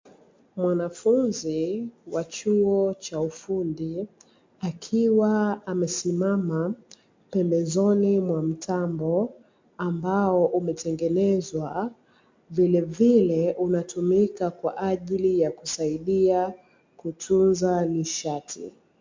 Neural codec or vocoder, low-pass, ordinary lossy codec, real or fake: none; 7.2 kHz; MP3, 48 kbps; real